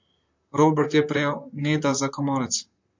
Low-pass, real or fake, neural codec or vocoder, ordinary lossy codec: 7.2 kHz; fake; vocoder, 44.1 kHz, 128 mel bands, Pupu-Vocoder; MP3, 48 kbps